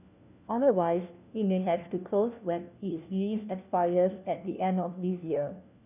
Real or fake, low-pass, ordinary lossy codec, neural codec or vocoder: fake; 3.6 kHz; none; codec, 16 kHz, 1 kbps, FunCodec, trained on LibriTTS, 50 frames a second